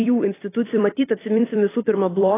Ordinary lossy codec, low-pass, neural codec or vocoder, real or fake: AAC, 16 kbps; 3.6 kHz; vocoder, 44.1 kHz, 128 mel bands every 256 samples, BigVGAN v2; fake